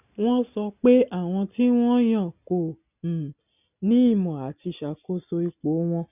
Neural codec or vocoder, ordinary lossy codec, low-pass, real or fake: none; none; 3.6 kHz; real